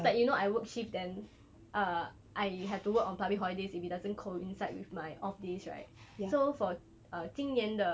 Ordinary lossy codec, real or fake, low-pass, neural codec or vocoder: none; real; none; none